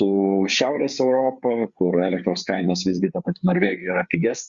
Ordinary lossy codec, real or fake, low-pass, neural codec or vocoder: Opus, 64 kbps; fake; 7.2 kHz; codec, 16 kHz, 4 kbps, FreqCodec, larger model